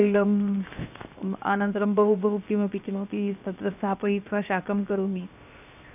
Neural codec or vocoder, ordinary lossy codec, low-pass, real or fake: codec, 16 kHz, 0.7 kbps, FocalCodec; none; 3.6 kHz; fake